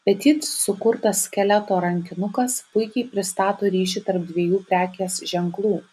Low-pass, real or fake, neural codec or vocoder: 14.4 kHz; real; none